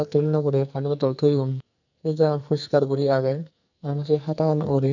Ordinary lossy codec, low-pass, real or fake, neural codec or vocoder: none; 7.2 kHz; fake; codec, 44.1 kHz, 2.6 kbps, SNAC